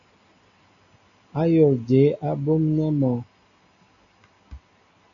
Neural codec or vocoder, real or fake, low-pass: none; real; 7.2 kHz